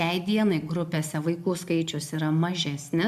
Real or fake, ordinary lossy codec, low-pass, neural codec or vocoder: real; AAC, 96 kbps; 14.4 kHz; none